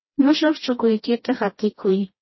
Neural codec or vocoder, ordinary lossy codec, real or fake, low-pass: codec, 16 kHz, 1 kbps, FreqCodec, smaller model; MP3, 24 kbps; fake; 7.2 kHz